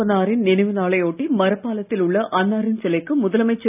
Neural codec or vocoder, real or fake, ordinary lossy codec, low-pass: none; real; none; 3.6 kHz